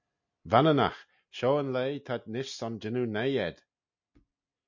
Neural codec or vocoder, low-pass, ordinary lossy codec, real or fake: none; 7.2 kHz; MP3, 48 kbps; real